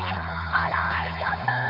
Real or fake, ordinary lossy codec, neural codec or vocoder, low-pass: fake; AAC, 32 kbps; codec, 16 kHz, 4.8 kbps, FACodec; 5.4 kHz